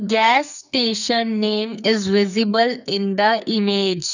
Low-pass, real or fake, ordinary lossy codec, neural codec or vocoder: 7.2 kHz; fake; none; codec, 44.1 kHz, 2.6 kbps, SNAC